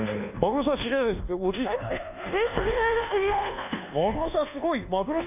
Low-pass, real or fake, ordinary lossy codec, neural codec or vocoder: 3.6 kHz; fake; none; codec, 24 kHz, 1.2 kbps, DualCodec